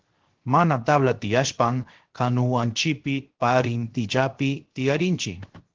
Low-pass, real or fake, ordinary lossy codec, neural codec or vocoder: 7.2 kHz; fake; Opus, 16 kbps; codec, 16 kHz, 0.7 kbps, FocalCodec